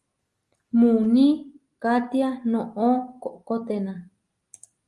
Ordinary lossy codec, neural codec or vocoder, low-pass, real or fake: Opus, 32 kbps; none; 10.8 kHz; real